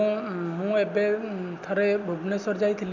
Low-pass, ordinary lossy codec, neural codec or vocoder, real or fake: 7.2 kHz; none; none; real